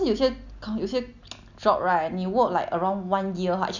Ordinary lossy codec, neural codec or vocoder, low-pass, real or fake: none; none; 7.2 kHz; real